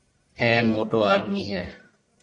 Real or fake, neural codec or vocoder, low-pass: fake; codec, 44.1 kHz, 1.7 kbps, Pupu-Codec; 10.8 kHz